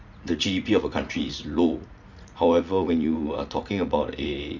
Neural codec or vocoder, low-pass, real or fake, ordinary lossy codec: vocoder, 44.1 kHz, 80 mel bands, Vocos; 7.2 kHz; fake; none